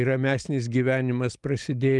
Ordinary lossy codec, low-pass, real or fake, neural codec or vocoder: Opus, 32 kbps; 10.8 kHz; real; none